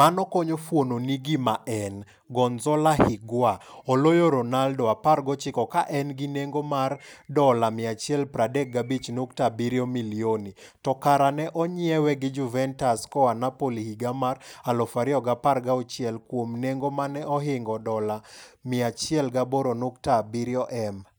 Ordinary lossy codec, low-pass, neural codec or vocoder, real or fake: none; none; none; real